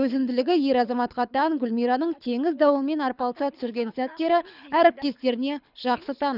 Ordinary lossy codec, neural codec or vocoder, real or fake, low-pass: none; codec, 24 kHz, 6 kbps, HILCodec; fake; 5.4 kHz